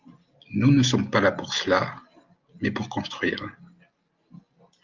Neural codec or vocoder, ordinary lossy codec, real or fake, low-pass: none; Opus, 24 kbps; real; 7.2 kHz